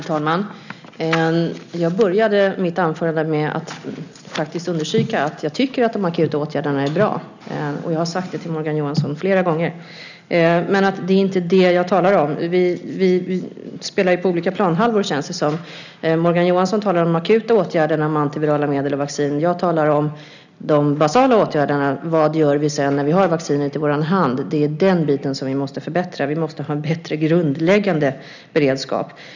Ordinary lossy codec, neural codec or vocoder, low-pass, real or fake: none; none; 7.2 kHz; real